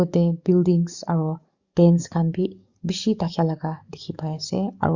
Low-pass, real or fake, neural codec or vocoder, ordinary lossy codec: 7.2 kHz; fake; codec, 44.1 kHz, 7.8 kbps, DAC; Opus, 64 kbps